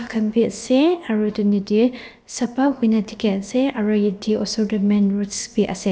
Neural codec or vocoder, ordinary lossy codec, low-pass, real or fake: codec, 16 kHz, about 1 kbps, DyCAST, with the encoder's durations; none; none; fake